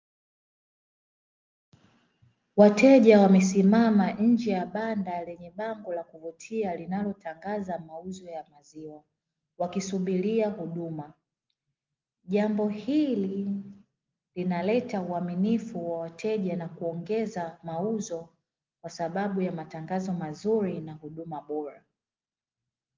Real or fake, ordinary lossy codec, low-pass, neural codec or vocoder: real; Opus, 32 kbps; 7.2 kHz; none